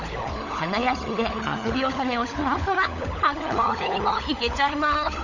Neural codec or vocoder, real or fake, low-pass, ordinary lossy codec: codec, 16 kHz, 16 kbps, FunCodec, trained on LibriTTS, 50 frames a second; fake; 7.2 kHz; none